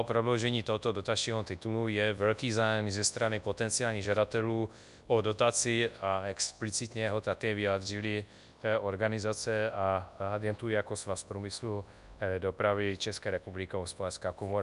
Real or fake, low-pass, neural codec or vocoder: fake; 10.8 kHz; codec, 24 kHz, 0.9 kbps, WavTokenizer, large speech release